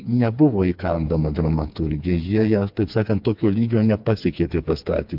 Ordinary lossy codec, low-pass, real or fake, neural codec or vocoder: MP3, 48 kbps; 5.4 kHz; fake; codec, 16 kHz, 4 kbps, FreqCodec, smaller model